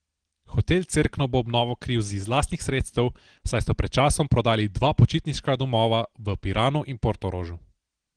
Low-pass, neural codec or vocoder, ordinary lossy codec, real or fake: 9.9 kHz; none; Opus, 16 kbps; real